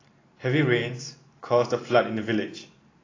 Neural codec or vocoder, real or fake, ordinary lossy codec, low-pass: vocoder, 44.1 kHz, 128 mel bands every 512 samples, BigVGAN v2; fake; AAC, 32 kbps; 7.2 kHz